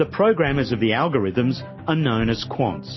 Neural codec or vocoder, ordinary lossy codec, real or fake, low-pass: none; MP3, 24 kbps; real; 7.2 kHz